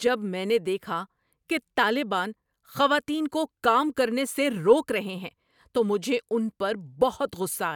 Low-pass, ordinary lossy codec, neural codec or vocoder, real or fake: 19.8 kHz; none; none; real